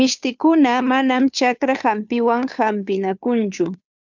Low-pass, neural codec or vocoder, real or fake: 7.2 kHz; codec, 16 kHz, 2 kbps, FunCodec, trained on Chinese and English, 25 frames a second; fake